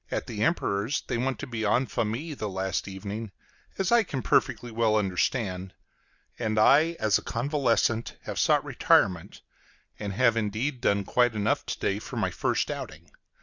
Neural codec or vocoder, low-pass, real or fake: none; 7.2 kHz; real